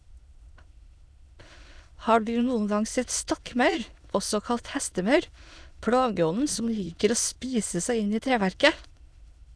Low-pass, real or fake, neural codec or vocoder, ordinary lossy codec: none; fake; autoencoder, 22.05 kHz, a latent of 192 numbers a frame, VITS, trained on many speakers; none